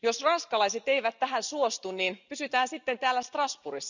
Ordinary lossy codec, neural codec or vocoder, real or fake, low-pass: none; none; real; 7.2 kHz